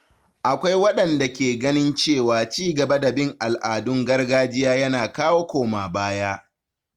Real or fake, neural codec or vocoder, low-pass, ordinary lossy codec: real; none; 19.8 kHz; Opus, 32 kbps